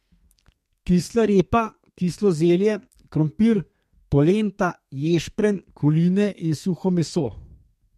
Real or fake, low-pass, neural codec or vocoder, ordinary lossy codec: fake; 14.4 kHz; codec, 32 kHz, 1.9 kbps, SNAC; MP3, 64 kbps